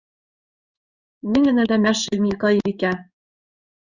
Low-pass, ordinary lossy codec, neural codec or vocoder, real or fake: 7.2 kHz; Opus, 64 kbps; codec, 16 kHz in and 24 kHz out, 1 kbps, XY-Tokenizer; fake